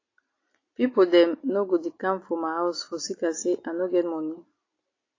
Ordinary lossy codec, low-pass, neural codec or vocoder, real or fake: AAC, 32 kbps; 7.2 kHz; none; real